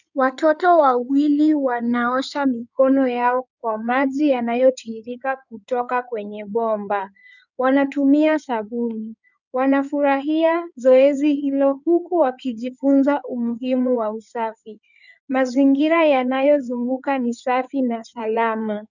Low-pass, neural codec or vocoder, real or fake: 7.2 kHz; codec, 16 kHz in and 24 kHz out, 2.2 kbps, FireRedTTS-2 codec; fake